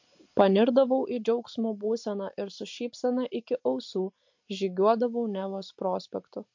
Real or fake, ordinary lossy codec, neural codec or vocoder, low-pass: real; MP3, 48 kbps; none; 7.2 kHz